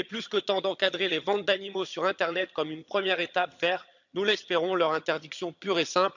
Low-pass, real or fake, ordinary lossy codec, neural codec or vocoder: 7.2 kHz; fake; none; vocoder, 22.05 kHz, 80 mel bands, HiFi-GAN